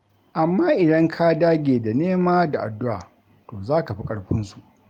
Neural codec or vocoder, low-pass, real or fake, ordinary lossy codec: none; 19.8 kHz; real; Opus, 32 kbps